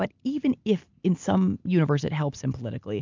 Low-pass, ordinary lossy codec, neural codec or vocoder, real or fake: 7.2 kHz; MP3, 64 kbps; none; real